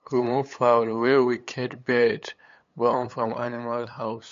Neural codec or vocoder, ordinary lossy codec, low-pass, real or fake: codec, 16 kHz, 8 kbps, FunCodec, trained on LibriTTS, 25 frames a second; MP3, 48 kbps; 7.2 kHz; fake